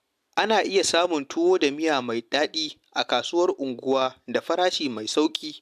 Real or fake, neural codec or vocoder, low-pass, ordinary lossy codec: real; none; 14.4 kHz; AAC, 96 kbps